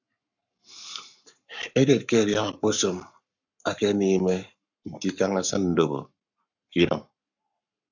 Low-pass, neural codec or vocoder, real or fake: 7.2 kHz; codec, 44.1 kHz, 7.8 kbps, Pupu-Codec; fake